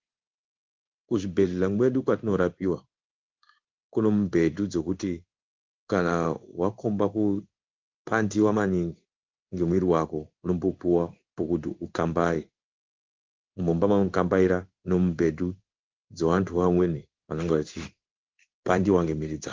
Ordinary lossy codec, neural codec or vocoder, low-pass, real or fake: Opus, 24 kbps; codec, 16 kHz in and 24 kHz out, 1 kbps, XY-Tokenizer; 7.2 kHz; fake